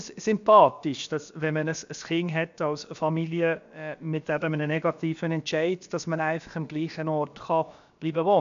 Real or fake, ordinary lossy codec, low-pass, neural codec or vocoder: fake; MP3, 64 kbps; 7.2 kHz; codec, 16 kHz, about 1 kbps, DyCAST, with the encoder's durations